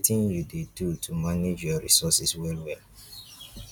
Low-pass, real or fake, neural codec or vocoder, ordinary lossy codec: 19.8 kHz; real; none; none